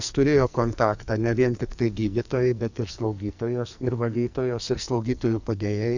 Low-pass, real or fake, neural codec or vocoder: 7.2 kHz; fake; codec, 44.1 kHz, 2.6 kbps, SNAC